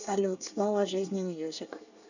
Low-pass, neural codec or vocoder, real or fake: 7.2 kHz; codec, 24 kHz, 1 kbps, SNAC; fake